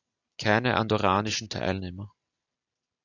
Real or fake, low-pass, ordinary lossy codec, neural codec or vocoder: real; 7.2 kHz; AAC, 48 kbps; none